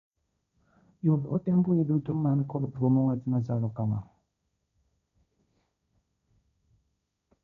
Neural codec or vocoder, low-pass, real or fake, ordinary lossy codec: codec, 16 kHz, 1.1 kbps, Voila-Tokenizer; 7.2 kHz; fake; none